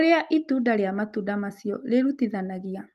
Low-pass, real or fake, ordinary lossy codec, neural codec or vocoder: 14.4 kHz; real; Opus, 24 kbps; none